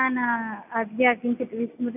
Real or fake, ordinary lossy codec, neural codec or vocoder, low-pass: real; none; none; 3.6 kHz